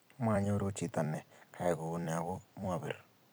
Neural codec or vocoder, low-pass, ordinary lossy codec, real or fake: vocoder, 44.1 kHz, 128 mel bands every 256 samples, BigVGAN v2; none; none; fake